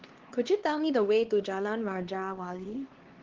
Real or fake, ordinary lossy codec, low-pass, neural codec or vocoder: fake; Opus, 16 kbps; 7.2 kHz; codec, 16 kHz, 2 kbps, X-Codec, HuBERT features, trained on LibriSpeech